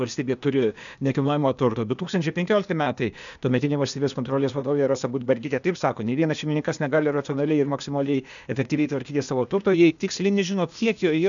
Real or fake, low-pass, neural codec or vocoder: fake; 7.2 kHz; codec, 16 kHz, 0.8 kbps, ZipCodec